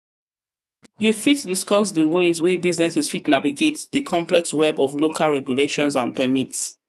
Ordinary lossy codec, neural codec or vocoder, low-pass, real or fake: none; codec, 44.1 kHz, 2.6 kbps, SNAC; 14.4 kHz; fake